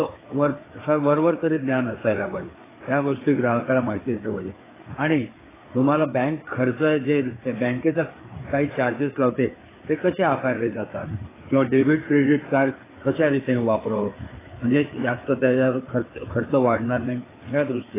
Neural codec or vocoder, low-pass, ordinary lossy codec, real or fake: codec, 16 kHz, 4 kbps, FreqCodec, larger model; 3.6 kHz; AAC, 16 kbps; fake